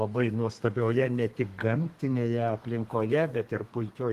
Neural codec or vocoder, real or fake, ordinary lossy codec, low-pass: codec, 32 kHz, 1.9 kbps, SNAC; fake; Opus, 24 kbps; 14.4 kHz